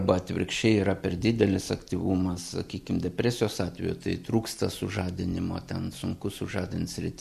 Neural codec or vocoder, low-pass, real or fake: none; 14.4 kHz; real